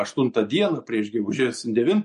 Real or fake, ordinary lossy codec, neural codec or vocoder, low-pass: real; MP3, 48 kbps; none; 14.4 kHz